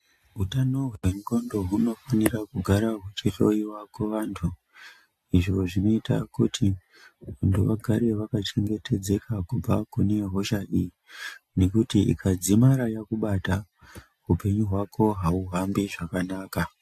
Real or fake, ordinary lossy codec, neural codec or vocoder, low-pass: real; AAC, 64 kbps; none; 14.4 kHz